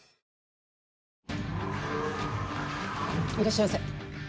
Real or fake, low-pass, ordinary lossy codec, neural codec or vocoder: real; none; none; none